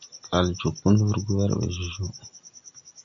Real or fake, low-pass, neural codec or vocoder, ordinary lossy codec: real; 7.2 kHz; none; MP3, 64 kbps